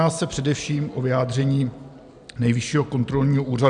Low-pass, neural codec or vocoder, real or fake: 9.9 kHz; none; real